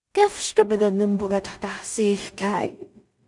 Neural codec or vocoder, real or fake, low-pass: codec, 16 kHz in and 24 kHz out, 0.4 kbps, LongCat-Audio-Codec, two codebook decoder; fake; 10.8 kHz